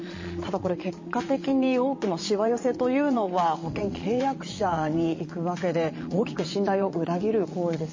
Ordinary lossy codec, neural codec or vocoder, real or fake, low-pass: MP3, 32 kbps; vocoder, 22.05 kHz, 80 mel bands, Vocos; fake; 7.2 kHz